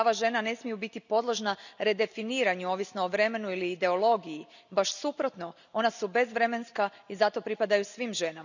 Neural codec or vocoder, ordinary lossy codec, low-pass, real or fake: none; none; 7.2 kHz; real